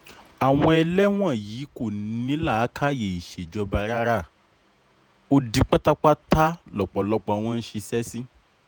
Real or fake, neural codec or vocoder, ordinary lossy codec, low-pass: fake; vocoder, 48 kHz, 128 mel bands, Vocos; none; none